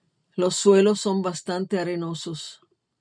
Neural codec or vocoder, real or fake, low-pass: none; real; 9.9 kHz